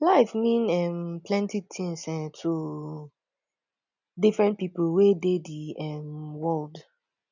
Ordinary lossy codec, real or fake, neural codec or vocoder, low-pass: none; real; none; 7.2 kHz